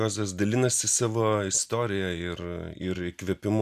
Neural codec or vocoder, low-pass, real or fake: none; 14.4 kHz; real